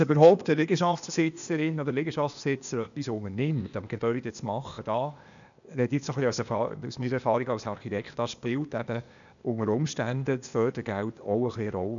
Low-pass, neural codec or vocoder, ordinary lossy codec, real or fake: 7.2 kHz; codec, 16 kHz, 0.8 kbps, ZipCodec; none; fake